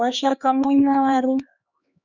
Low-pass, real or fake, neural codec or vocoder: 7.2 kHz; fake; codec, 16 kHz, 2 kbps, X-Codec, HuBERT features, trained on LibriSpeech